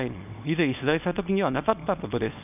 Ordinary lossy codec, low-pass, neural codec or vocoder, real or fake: none; 3.6 kHz; codec, 24 kHz, 0.9 kbps, WavTokenizer, small release; fake